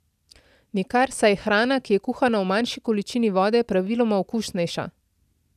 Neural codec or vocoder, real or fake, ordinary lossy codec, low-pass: none; real; none; 14.4 kHz